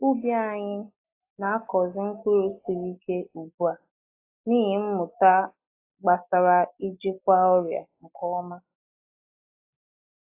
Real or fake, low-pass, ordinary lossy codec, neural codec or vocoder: real; 3.6 kHz; AAC, 24 kbps; none